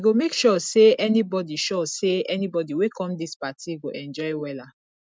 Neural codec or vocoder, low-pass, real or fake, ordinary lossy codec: none; none; real; none